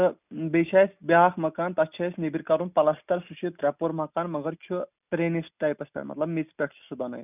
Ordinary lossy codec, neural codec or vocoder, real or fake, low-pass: none; none; real; 3.6 kHz